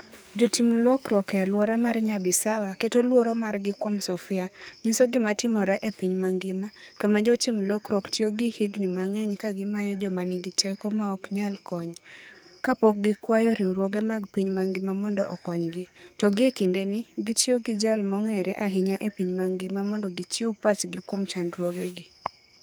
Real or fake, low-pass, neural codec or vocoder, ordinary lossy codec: fake; none; codec, 44.1 kHz, 2.6 kbps, SNAC; none